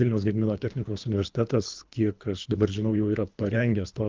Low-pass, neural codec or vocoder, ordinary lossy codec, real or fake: 7.2 kHz; codec, 24 kHz, 3 kbps, HILCodec; Opus, 24 kbps; fake